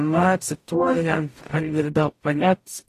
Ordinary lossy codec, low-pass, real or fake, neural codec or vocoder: AAC, 48 kbps; 14.4 kHz; fake; codec, 44.1 kHz, 0.9 kbps, DAC